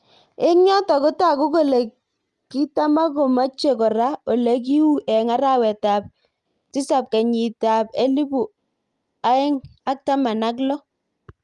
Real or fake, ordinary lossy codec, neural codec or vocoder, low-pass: real; Opus, 32 kbps; none; 10.8 kHz